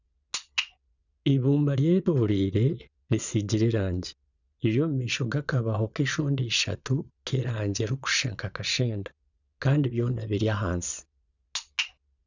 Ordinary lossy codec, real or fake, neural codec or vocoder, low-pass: none; fake; vocoder, 22.05 kHz, 80 mel bands, Vocos; 7.2 kHz